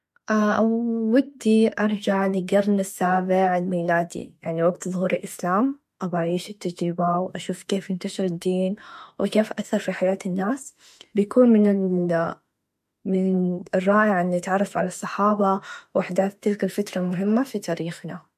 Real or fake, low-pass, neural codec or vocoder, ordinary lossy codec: fake; 14.4 kHz; autoencoder, 48 kHz, 32 numbers a frame, DAC-VAE, trained on Japanese speech; MP3, 64 kbps